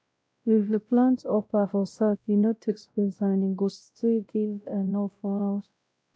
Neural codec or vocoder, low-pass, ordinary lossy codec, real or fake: codec, 16 kHz, 0.5 kbps, X-Codec, WavLM features, trained on Multilingual LibriSpeech; none; none; fake